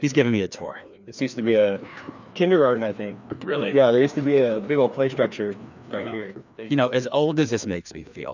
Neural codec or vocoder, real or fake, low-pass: codec, 16 kHz, 2 kbps, FreqCodec, larger model; fake; 7.2 kHz